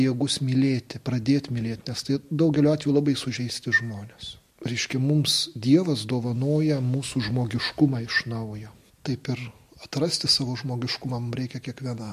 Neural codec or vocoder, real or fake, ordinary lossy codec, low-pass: none; real; MP3, 64 kbps; 14.4 kHz